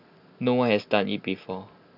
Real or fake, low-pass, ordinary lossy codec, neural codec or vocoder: real; 5.4 kHz; none; none